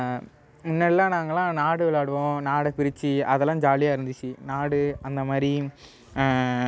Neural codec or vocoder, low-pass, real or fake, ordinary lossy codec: none; none; real; none